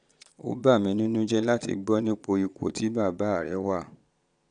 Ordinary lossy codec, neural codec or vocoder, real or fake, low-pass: none; vocoder, 22.05 kHz, 80 mel bands, Vocos; fake; 9.9 kHz